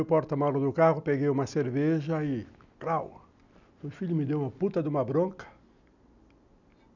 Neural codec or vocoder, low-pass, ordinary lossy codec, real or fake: none; 7.2 kHz; none; real